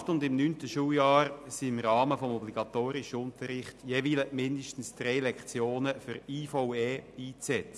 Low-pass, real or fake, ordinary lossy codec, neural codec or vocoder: none; real; none; none